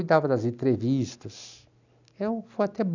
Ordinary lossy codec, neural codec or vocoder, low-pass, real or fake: none; none; 7.2 kHz; real